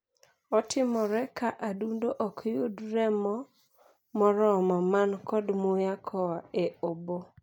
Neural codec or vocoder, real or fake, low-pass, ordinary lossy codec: none; real; 19.8 kHz; none